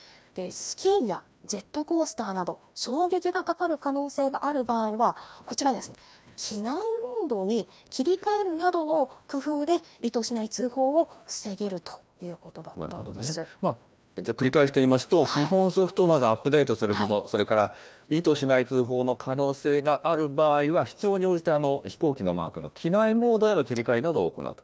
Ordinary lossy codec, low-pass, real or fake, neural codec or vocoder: none; none; fake; codec, 16 kHz, 1 kbps, FreqCodec, larger model